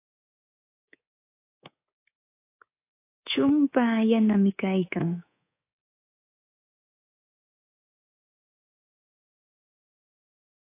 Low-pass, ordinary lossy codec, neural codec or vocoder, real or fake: 3.6 kHz; AAC, 24 kbps; codec, 24 kHz, 3.1 kbps, DualCodec; fake